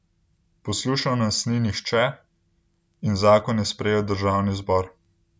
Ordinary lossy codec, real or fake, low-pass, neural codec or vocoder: none; real; none; none